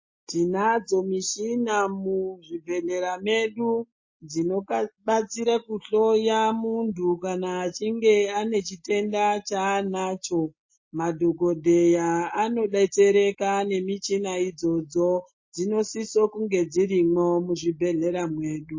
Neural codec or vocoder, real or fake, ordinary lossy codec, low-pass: none; real; MP3, 32 kbps; 7.2 kHz